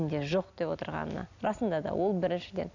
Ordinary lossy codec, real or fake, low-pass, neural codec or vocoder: none; real; 7.2 kHz; none